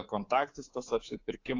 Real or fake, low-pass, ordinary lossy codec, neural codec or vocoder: fake; 7.2 kHz; AAC, 32 kbps; codec, 24 kHz, 3.1 kbps, DualCodec